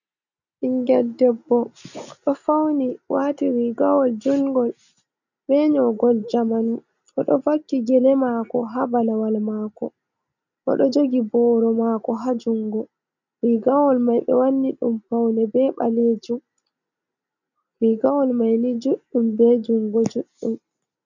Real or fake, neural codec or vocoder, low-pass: real; none; 7.2 kHz